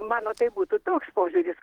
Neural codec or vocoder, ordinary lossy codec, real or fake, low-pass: vocoder, 44.1 kHz, 128 mel bands every 512 samples, BigVGAN v2; Opus, 16 kbps; fake; 19.8 kHz